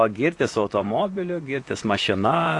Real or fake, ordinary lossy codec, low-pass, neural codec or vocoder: fake; AAC, 48 kbps; 10.8 kHz; vocoder, 44.1 kHz, 128 mel bands, Pupu-Vocoder